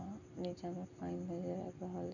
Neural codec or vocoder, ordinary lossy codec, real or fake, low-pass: none; none; real; 7.2 kHz